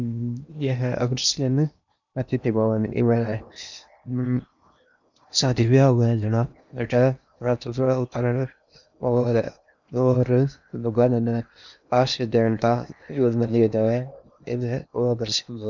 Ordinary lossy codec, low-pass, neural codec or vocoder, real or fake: none; 7.2 kHz; codec, 16 kHz in and 24 kHz out, 0.8 kbps, FocalCodec, streaming, 65536 codes; fake